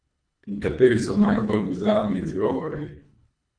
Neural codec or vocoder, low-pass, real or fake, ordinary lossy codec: codec, 24 kHz, 1.5 kbps, HILCodec; 9.9 kHz; fake; none